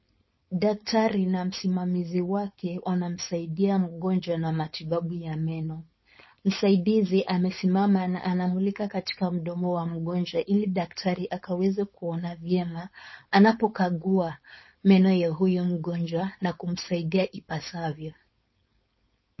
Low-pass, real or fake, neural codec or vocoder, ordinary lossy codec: 7.2 kHz; fake; codec, 16 kHz, 4.8 kbps, FACodec; MP3, 24 kbps